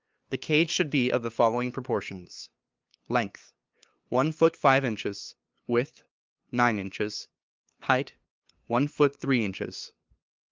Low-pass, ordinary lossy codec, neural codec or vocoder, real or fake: 7.2 kHz; Opus, 24 kbps; codec, 16 kHz, 2 kbps, FunCodec, trained on LibriTTS, 25 frames a second; fake